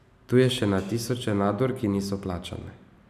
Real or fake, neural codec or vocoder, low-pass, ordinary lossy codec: real; none; 14.4 kHz; none